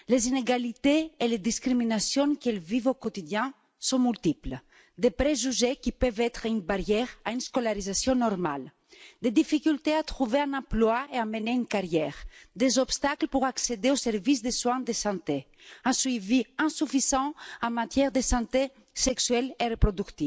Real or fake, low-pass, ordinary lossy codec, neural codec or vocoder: real; none; none; none